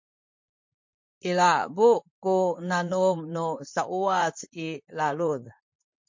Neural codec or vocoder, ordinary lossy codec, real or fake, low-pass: vocoder, 44.1 kHz, 128 mel bands, Pupu-Vocoder; MP3, 48 kbps; fake; 7.2 kHz